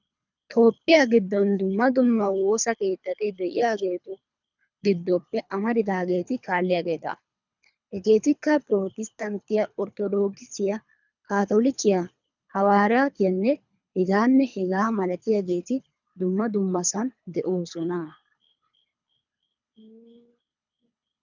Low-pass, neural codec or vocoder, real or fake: 7.2 kHz; codec, 24 kHz, 3 kbps, HILCodec; fake